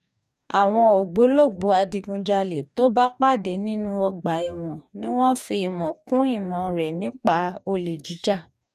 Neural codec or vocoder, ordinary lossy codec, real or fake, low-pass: codec, 44.1 kHz, 2.6 kbps, DAC; none; fake; 14.4 kHz